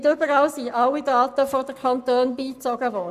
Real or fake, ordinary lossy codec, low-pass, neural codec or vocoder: fake; none; 14.4 kHz; codec, 44.1 kHz, 7.8 kbps, Pupu-Codec